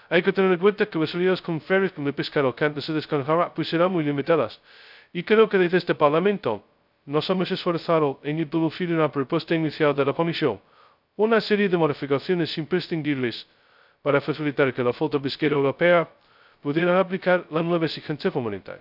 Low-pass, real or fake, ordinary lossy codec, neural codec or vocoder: 5.4 kHz; fake; none; codec, 16 kHz, 0.2 kbps, FocalCodec